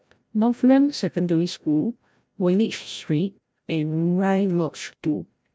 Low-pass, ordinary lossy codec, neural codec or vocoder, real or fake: none; none; codec, 16 kHz, 0.5 kbps, FreqCodec, larger model; fake